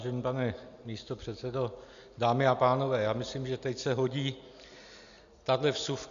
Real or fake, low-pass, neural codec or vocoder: real; 7.2 kHz; none